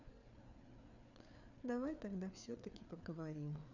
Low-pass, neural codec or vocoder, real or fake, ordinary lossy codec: 7.2 kHz; codec, 16 kHz, 4 kbps, FreqCodec, larger model; fake; none